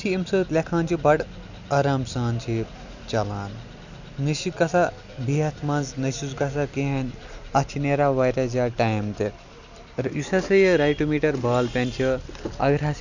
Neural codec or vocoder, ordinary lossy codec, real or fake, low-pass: none; none; real; 7.2 kHz